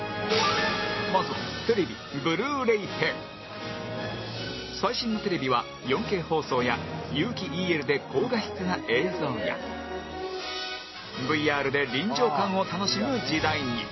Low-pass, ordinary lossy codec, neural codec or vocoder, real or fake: 7.2 kHz; MP3, 24 kbps; none; real